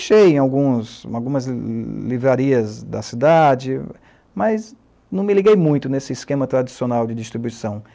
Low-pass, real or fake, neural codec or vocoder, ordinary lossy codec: none; real; none; none